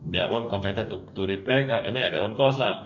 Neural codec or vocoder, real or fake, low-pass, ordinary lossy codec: codec, 44.1 kHz, 2.6 kbps, DAC; fake; 7.2 kHz; none